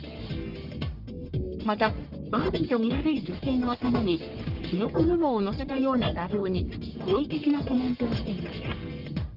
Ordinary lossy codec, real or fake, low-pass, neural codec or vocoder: Opus, 32 kbps; fake; 5.4 kHz; codec, 44.1 kHz, 1.7 kbps, Pupu-Codec